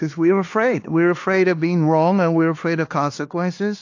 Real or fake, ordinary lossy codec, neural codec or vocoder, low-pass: fake; AAC, 48 kbps; codec, 16 kHz, 2 kbps, X-Codec, HuBERT features, trained on LibriSpeech; 7.2 kHz